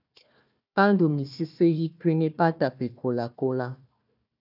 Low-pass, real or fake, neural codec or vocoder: 5.4 kHz; fake; codec, 16 kHz, 1 kbps, FunCodec, trained on Chinese and English, 50 frames a second